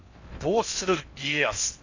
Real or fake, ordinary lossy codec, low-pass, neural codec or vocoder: fake; AAC, 48 kbps; 7.2 kHz; codec, 16 kHz in and 24 kHz out, 0.6 kbps, FocalCodec, streaming, 4096 codes